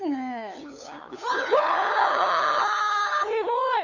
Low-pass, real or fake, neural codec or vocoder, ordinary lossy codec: 7.2 kHz; fake; codec, 16 kHz, 8 kbps, FunCodec, trained on LibriTTS, 25 frames a second; none